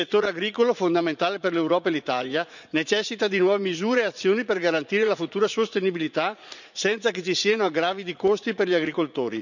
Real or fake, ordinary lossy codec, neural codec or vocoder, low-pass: fake; none; vocoder, 44.1 kHz, 80 mel bands, Vocos; 7.2 kHz